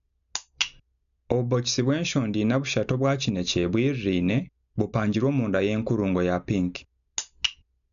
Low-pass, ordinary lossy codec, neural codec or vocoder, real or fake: 7.2 kHz; none; none; real